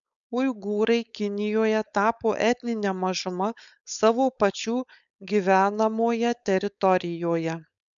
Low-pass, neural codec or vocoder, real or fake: 7.2 kHz; codec, 16 kHz, 4.8 kbps, FACodec; fake